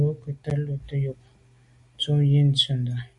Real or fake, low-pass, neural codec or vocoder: real; 10.8 kHz; none